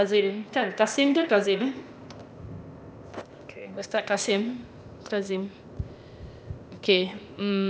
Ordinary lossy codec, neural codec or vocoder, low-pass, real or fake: none; codec, 16 kHz, 0.8 kbps, ZipCodec; none; fake